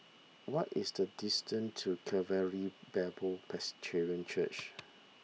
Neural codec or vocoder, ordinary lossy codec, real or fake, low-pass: none; none; real; none